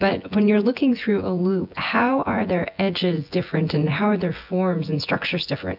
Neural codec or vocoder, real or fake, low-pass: vocoder, 24 kHz, 100 mel bands, Vocos; fake; 5.4 kHz